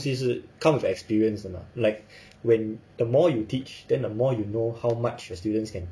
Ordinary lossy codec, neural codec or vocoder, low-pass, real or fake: none; none; none; real